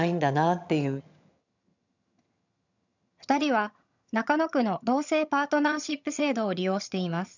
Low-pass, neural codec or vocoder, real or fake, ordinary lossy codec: 7.2 kHz; vocoder, 22.05 kHz, 80 mel bands, HiFi-GAN; fake; none